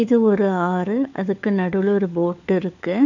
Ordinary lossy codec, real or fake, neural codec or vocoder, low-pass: none; fake; codec, 16 kHz, 4 kbps, FunCodec, trained on LibriTTS, 50 frames a second; 7.2 kHz